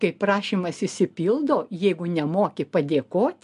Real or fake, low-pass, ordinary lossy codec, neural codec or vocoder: real; 10.8 kHz; MP3, 64 kbps; none